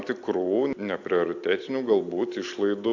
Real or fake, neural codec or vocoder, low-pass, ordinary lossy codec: real; none; 7.2 kHz; AAC, 48 kbps